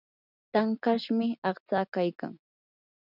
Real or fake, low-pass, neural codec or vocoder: real; 5.4 kHz; none